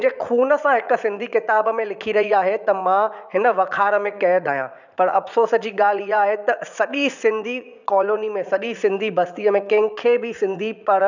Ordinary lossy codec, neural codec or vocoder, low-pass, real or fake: none; vocoder, 44.1 kHz, 80 mel bands, Vocos; 7.2 kHz; fake